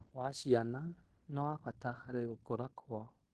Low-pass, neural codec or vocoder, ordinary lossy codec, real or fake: 10.8 kHz; codec, 16 kHz in and 24 kHz out, 0.9 kbps, LongCat-Audio-Codec, fine tuned four codebook decoder; Opus, 16 kbps; fake